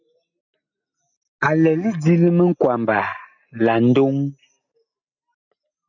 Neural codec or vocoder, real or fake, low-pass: none; real; 7.2 kHz